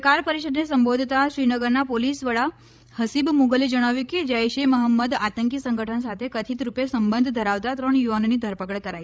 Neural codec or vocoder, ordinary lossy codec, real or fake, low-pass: codec, 16 kHz, 16 kbps, FreqCodec, larger model; none; fake; none